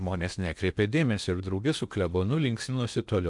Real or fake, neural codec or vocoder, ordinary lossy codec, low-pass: fake; codec, 16 kHz in and 24 kHz out, 0.8 kbps, FocalCodec, streaming, 65536 codes; MP3, 96 kbps; 10.8 kHz